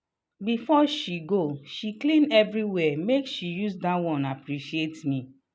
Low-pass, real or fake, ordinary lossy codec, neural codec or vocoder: none; real; none; none